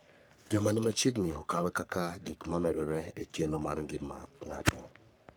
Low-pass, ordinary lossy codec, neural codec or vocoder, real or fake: none; none; codec, 44.1 kHz, 3.4 kbps, Pupu-Codec; fake